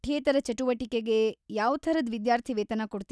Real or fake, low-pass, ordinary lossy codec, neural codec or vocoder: real; none; none; none